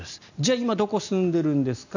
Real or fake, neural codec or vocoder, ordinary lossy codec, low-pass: real; none; none; 7.2 kHz